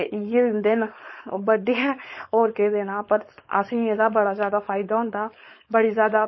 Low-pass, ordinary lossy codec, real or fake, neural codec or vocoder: 7.2 kHz; MP3, 24 kbps; fake; codec, 16 kHz, 4.8 kbps, FACodec